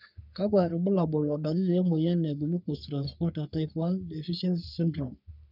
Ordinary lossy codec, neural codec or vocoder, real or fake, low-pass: none; codec, 44.1 kHz, 3.4 kbps, Pupu-Codec; fake; 5.4 kHz